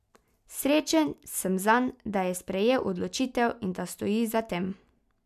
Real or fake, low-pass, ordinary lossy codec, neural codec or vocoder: real; 14.4 kHz; none; none